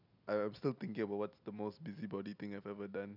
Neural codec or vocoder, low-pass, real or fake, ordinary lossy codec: none; 5.4 kHz; real; none